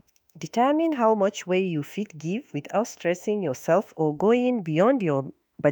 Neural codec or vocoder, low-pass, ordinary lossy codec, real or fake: autoencoder, 48 kHz, 32 numbers a frame, DAC-VAE, trained on Japanese speech; none; none; fake